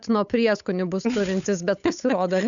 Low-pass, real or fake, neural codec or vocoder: 7.2 kHz; fake; codec, 16 kHz, 8 kbps, FunCodec, trained on Chinese and English, 25 frames a second